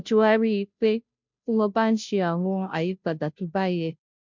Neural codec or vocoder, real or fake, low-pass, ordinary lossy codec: codec, 16 kHz, 0.5 kbps, FunCodec, trained on Chinese and English, 25 frames a second; fake; 7.2 kHz; MP3, 64 kbps